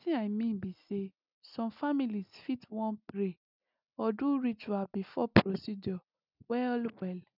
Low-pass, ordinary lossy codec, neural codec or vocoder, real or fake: 5.4 kHz; none; none; real